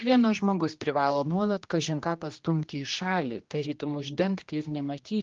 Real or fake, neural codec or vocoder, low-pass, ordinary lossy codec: fake; codec, 16 kHz, 1 kbps, X-Codec, HuBERT features, trained on general audio; 7.2 kHz; Opus, 32 kbps